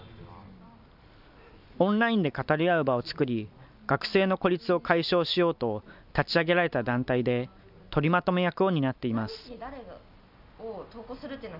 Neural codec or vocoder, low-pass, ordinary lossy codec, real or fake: none; 5.4 kHz; none; real